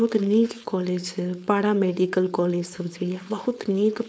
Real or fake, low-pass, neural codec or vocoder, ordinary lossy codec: fake; none; codec, 16 kHz, 4.8 kbps, FACodec; none